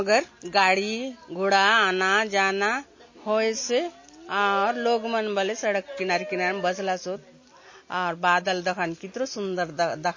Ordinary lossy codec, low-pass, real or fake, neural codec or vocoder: MP3, 32 kbps; 7.2 kHz; real; none